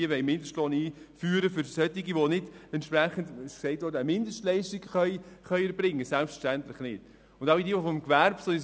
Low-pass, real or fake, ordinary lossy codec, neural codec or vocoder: none; real; none; none